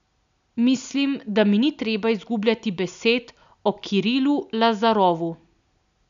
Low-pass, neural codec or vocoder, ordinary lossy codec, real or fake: 7.2 kHz; none; MP3, 96 kbps; real